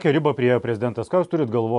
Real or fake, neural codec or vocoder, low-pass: real; none; 10.8 kHz